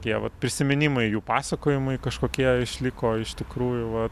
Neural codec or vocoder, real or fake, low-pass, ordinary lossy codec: none; real; 14.4 kHz; AAC, 96 kbps